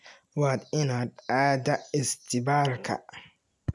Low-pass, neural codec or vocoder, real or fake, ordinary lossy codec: none; none; real; none